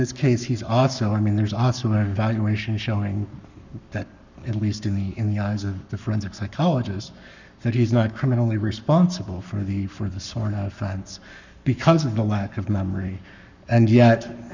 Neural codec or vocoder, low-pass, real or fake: codec, 44.1 kHz, 7.8 kbps, Pupu-Codec; 7.2 kHz; fake